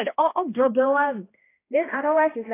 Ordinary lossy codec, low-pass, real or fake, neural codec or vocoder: AAC, 16 kbps; 3.6 kHz; fake; codec, 16 kHz, 1 kbps, X-Codec, HuBERT features, trained on balanced general audio